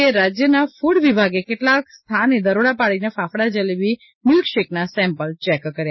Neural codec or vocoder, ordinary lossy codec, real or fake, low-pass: none; MP3, 24 kbps; real; 7.2 kHz